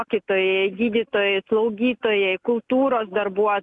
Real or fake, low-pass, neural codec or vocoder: real; 10.8 kHz; none